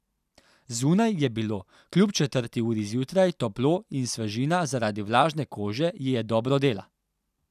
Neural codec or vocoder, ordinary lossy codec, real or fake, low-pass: none; none; real; 14.4 kHz